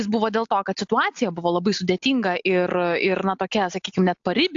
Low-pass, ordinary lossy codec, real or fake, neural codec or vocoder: 7.2 kHz; AAC, 64 kbps; real; none